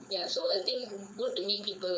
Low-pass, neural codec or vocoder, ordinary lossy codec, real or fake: none; codec, 16 kHz, 4.8 kbps, FACodec; none; fake